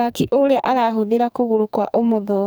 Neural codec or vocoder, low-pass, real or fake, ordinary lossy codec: codec, 44.1 kHz, 2.6 kbps, SNAC; none; fake; none